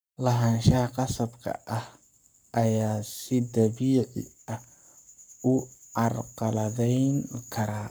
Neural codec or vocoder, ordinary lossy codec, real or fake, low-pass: codec, 44.1 kHz, 7.8 kbps, Pupu-Codec; none; fake; none